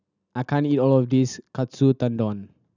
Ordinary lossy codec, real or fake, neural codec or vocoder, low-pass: none; real; none; 7.2 kHz